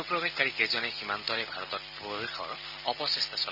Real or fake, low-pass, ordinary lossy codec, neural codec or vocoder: real; 5.4 kHz; none; none